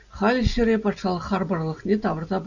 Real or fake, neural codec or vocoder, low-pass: real; none; 7.2 kHz